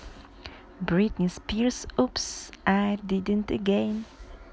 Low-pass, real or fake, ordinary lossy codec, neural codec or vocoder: none; real; none; none